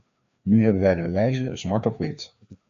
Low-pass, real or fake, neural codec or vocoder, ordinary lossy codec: 7.2 kHz; fake; codec, 16 kHz, 2 kbps, FreqCodec, larger model; AAC, 48 kbps